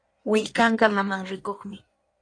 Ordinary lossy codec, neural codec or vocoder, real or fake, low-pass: AAC, 48 kbps; codec, 16 kHz in and 24 kHz out, 1.1 kbps, FireRedTTS-2 codec; fake; 9.9 kHz